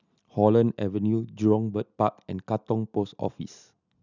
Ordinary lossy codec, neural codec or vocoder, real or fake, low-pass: none; none; real; 7.2 kHz